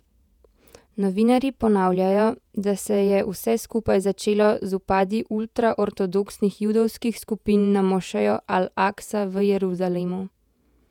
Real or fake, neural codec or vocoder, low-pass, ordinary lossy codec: fake; vocoder, 48 kHz, 128 mel bands, Vocos; 19.8 kHz; none